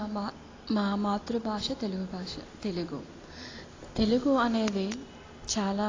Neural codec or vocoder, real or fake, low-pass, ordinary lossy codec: none; real; 7.2 kHz; AAC, 32 kbps